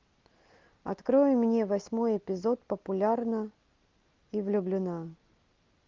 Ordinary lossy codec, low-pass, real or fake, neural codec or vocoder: Opus, 32 kbps; 7.2 kHz; real; none